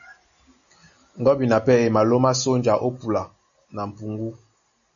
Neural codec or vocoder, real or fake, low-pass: none; real; 7.2 kHz